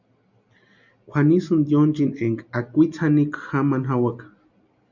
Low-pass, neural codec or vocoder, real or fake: 7.2 kHz; none; real